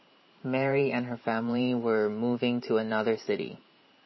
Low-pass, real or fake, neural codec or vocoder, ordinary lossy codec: 7.2 kHz; fake; vocoder, 44.1 kHz, 128 mel bands every 512 samples, BigVGAN v2; MP3, 24 kbps